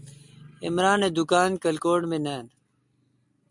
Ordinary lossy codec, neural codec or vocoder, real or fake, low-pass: MP3, 96 kbps; none; real; 10.8 kHz